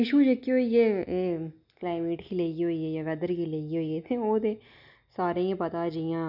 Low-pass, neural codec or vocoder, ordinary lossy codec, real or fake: 5.4 kHz; none; none; real